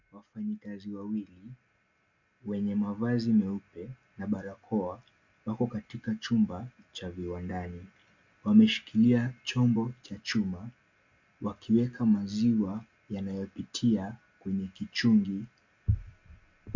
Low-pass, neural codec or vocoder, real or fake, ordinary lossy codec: 7.2 kHz; none; real; MP3, 48 kbps